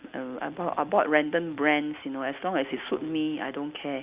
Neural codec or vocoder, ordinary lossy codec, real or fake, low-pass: none; Opus, 64 kbps; real; 3.6 kHz